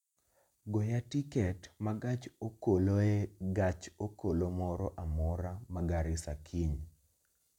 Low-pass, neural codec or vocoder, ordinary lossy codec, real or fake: 19.8 kHz; none; none; real